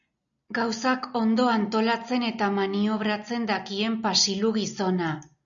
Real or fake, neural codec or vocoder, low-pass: real; none; 7.2 kHz